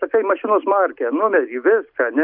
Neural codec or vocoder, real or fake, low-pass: none; real; 5.4 kHz